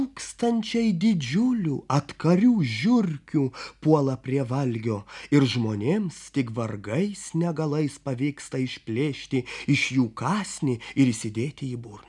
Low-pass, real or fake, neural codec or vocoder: 10.8 kHz; real; none